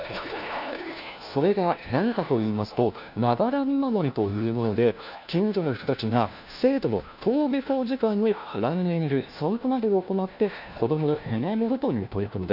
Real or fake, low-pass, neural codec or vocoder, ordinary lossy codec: fake; 5.4 kHz; codec, 16 kHz, 1 kbps, FunCodec, trained on LibriTTS, 50 frames a second; none